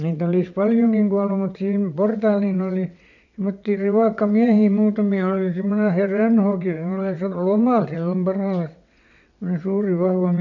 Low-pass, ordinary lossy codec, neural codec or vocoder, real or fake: 7.2 kHz; none; vocoder, 22.05 kHz, 80 mel bands, WaveNeXt; fake